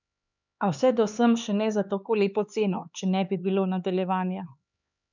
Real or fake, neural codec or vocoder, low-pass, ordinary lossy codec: fake; codec, 16 kHz, 4 kbps, X-Codec, HuBERT features, trained on LibriSpeech; 7.2 kHz; none